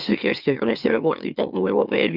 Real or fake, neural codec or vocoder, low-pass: fake; autoencoder, 44.1 kHz, a latent of 192 numbers a frame, MeloTTS; 5.4 kHz